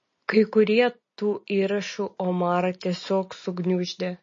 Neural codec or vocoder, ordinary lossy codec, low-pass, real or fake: none; MP3, 32 kbps; 7.2 kHz; real